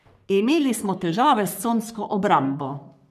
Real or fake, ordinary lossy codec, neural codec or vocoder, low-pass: fake; none; codec, 44.1 kHz, 3.4 kbps, Pupu-Codec; 14.4 kHz